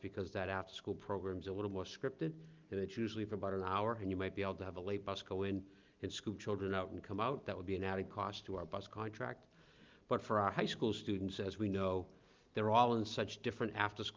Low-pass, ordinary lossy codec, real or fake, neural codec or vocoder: 7.2 kHz; Opus, 32 kbps; real; none